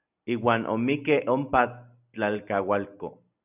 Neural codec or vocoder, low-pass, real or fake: none; 3.6 kHz; real